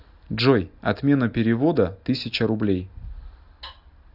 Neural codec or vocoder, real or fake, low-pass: none; real; 5.4 kHz